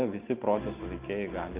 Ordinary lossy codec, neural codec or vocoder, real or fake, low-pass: Opus, 24 kbps; none; real; 3.6 kHz